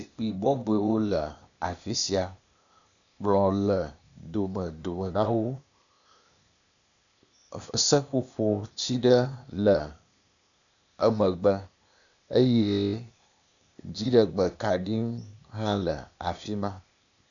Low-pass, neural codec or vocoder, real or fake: 7.2 kHz; codec, 16 kHz, 0.8 kbps, ZipCodec; fake